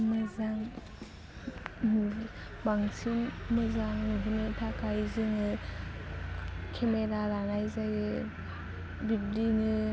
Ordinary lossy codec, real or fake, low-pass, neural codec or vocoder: none; real; none; none